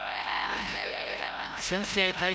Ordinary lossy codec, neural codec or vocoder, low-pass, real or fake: none; codec, 16 kHz, 0.5 kbps, FreqCodec, larger model; none; fake